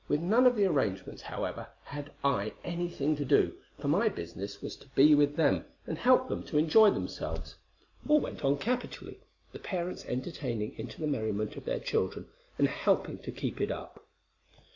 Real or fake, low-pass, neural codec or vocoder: real; 7.2 kHz; none